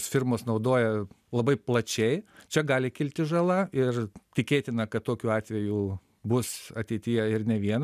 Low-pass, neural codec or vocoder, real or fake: 14.4 kHz; none; real